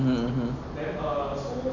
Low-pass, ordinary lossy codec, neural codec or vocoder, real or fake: 7.2 kHz; none; none; real